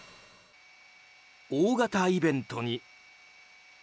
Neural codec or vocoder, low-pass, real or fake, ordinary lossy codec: none; none; real; none